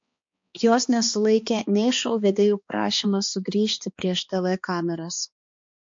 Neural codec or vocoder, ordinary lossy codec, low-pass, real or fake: codec, 16 kHz, 2 kbps, X-Codec, HuBERT features, trained on balanced general audio; MP3, 48 kbps; 7.2 kHz; fake